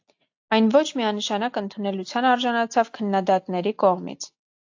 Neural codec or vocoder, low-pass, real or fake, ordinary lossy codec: none; 7.2 kHz; real; AAC, 48 kbps